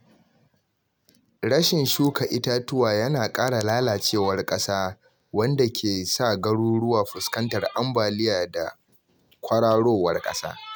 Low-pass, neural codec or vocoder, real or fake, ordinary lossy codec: none; none; real; none